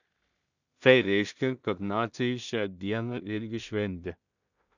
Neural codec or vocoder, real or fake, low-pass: codec, 16 kHz in and 24 kHz out, 0.4 kbps, LongCat-Audio-Codec, two codebook decoder; fake; 7.2 kHz